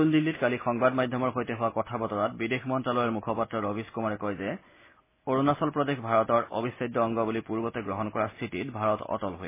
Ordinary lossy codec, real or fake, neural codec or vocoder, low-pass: MP3, 16 kbps; real; none; 3.6 kHz